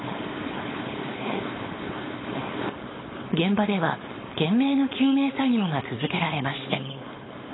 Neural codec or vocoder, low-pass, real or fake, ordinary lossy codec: codec, 16 kHz, 4.8 kbps, FACodec; 7.2 kHz; fake; AAC, 16 kbps